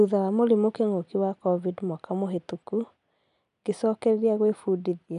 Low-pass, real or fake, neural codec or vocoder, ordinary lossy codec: 10.8 kHz; real; none; none